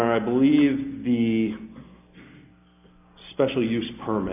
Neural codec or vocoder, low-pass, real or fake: none; 3.6 kHz; real